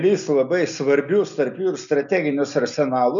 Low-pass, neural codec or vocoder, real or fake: 7.2 kHz; none; real